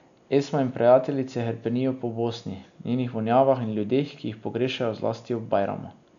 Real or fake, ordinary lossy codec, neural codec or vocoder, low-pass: real; none; none; 7.2 kHz